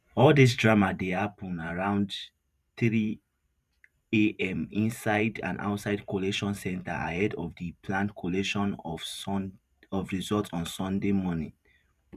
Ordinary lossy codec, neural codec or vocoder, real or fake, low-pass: none; vocoder, 44.1 kHz, 128 mel bands every 512 samples, BigVGAN v2; fake; 14.4 kHz